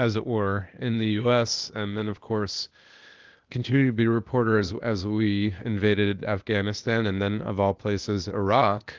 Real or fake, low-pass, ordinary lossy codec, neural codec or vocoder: fake; 7.2 kHz; Opus, 24 kbps; codec, 16 kHz, 0.8 kbps, ZipCodec